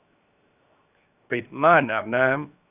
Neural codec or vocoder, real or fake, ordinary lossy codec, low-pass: codec, 16 kHz, 0.7 kbps, FocalCodec; fake; none; 3.6 kHz